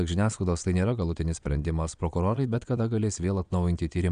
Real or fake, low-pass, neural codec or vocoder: fake; 9.9 kHz; vocoder, 22.05 kHz, 80 mel bands, Vocos